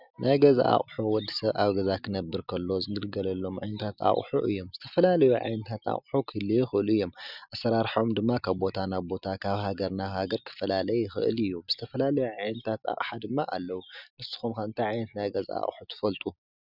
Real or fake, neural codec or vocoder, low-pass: real; none; 5.4 kHz